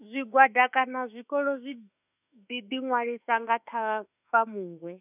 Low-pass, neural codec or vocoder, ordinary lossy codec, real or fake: 3.6 kHz; autoencoder, 48 kHz, 32 numbers a frame, DAC-VAE, trained on Japanese speech; none; fake